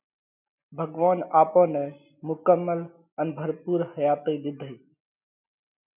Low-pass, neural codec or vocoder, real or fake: 3.6 kHz; none; real